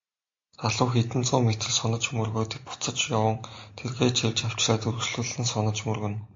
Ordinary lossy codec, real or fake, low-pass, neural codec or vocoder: AAC, 48 kbps; real; 7.2 kHz; none